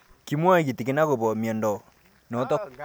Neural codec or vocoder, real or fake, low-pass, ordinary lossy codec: none; real; none; none